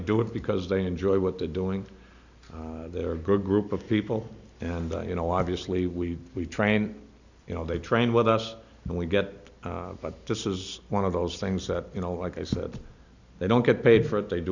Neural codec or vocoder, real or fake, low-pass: none; real; 7.2 kHz